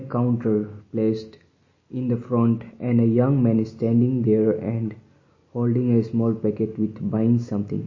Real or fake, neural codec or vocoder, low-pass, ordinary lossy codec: real; none; 7.2 kHz; MP3, 32 kbps